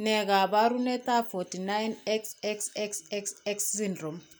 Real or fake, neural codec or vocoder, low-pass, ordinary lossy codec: real; none; none; none